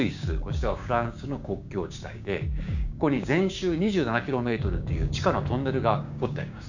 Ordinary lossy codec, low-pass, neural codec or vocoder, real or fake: none; 7.2 kHz; codec, 16 kHz, 6 kbps, DAC; fake